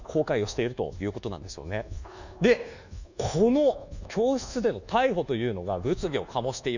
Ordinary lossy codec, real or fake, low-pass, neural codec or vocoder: none; fake; 7.2 kHz; codec, 24 kHz, 1.2 kbps, DualCodec